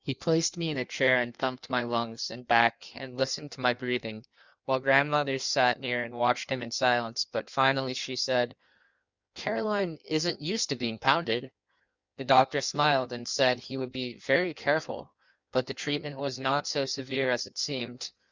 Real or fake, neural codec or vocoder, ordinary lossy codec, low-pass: fake; codec, 16 kHz in and 24 kHz out, 1.1 kbps, FireRedTTS-2 codec; Opus, 64 kbps; 7.2 kHz